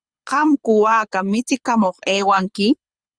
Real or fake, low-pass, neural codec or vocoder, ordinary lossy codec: fake; 9.9 kHz; codec, 24 kHz, 6 kbps, HILCodec; Opus, 64 kbps